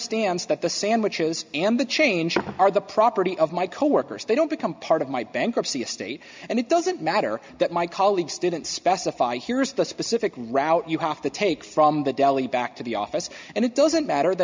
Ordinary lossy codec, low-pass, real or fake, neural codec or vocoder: MP3, 64 kbps; 7.2 kHz; real; none